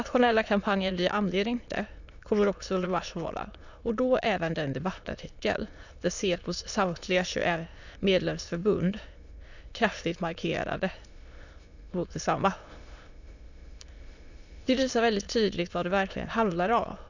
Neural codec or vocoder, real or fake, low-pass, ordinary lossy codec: autoencoder, 22.05 kHz, a latent of 192 numbers a frame, VITS, trained on many speakers; fake; 7.2 kHz; none